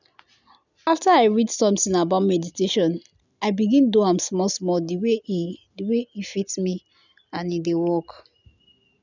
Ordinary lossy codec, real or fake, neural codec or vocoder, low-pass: none; real; none; 7.2 kHz